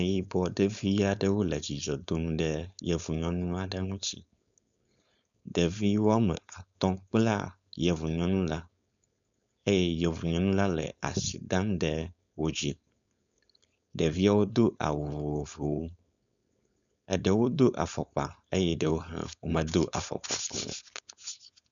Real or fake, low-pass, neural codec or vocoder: fake; 7.2 kHz; codec, 16 kHz, 4.8 kbps, FACodec